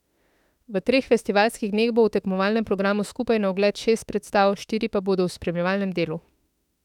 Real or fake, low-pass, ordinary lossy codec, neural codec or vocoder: fake; 19.8 kHz; none; autoencoder, 48 kHz, 32 numbers a frame, DAC-VAE, trained on Japanese speech